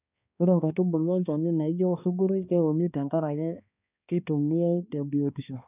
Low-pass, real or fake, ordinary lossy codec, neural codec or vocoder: 3.6 kHz; fake; none; codec, 16 kHz, 2 kbps, X-Codec, HuBERT features, trained on balanced general audio